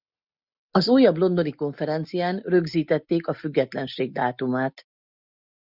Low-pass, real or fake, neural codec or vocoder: 5.4 kHz; real; none